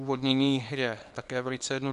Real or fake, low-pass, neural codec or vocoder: fake; 10.8 kHz; codec, 24 kHz, 0.9 kbps, WavTokenizer, small release